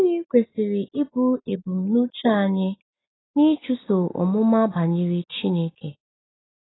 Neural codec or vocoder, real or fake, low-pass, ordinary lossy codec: none; real; 7.2 kHz; AAC, 16 kbps